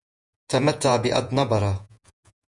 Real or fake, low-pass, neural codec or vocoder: fake; 10.8 kHz; vocoder, 48 kHz, 128 mel bands, Vocos